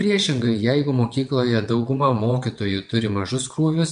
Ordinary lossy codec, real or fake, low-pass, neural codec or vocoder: MP3, 64 kbps; fake; 9.9 kHz; vocoder, 22.05 kHz, 80 mel bands, WaveNeXt